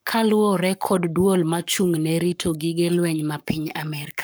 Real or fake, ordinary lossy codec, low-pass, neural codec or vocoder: fake; none; none; codec, 44.1 kHz, 7.8 kbps, Pupu-Codec